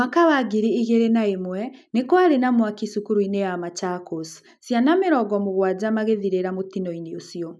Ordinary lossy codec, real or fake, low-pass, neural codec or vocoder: none; real; none; none